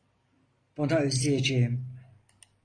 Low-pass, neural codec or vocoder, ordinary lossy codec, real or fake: 9.9 kHz; none; AAC, 32 kbps; real